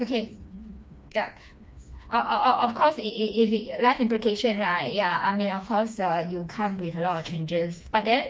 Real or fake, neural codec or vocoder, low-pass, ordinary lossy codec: fake; codec, 16 kHz, 2 kbps, FreqCodec, smaller model; none; none